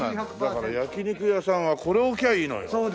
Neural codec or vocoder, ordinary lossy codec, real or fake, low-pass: none; none; real; none